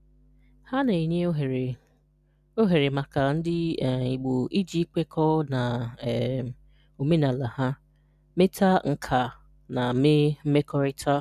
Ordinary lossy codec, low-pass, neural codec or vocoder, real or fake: none; 14.4 kHz; none; real